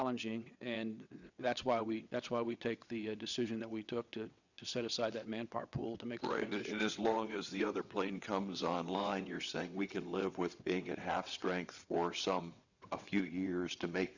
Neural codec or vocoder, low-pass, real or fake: vocoder, 22.05 kHz, 80 mel bands, WaveNeXt; 7.2 kHz; fake